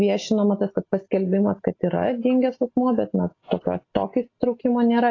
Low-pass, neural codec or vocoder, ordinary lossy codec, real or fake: 7.2 kHz; none; AAC, 32 kbps; real